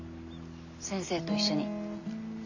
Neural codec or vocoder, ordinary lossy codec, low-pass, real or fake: none; none; 7.2 kHz; real